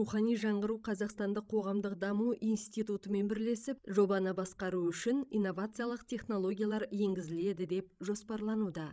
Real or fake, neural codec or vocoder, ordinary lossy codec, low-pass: fake; codec, 16 kHz, 16 kbps, FreqCodec, larger model; none; none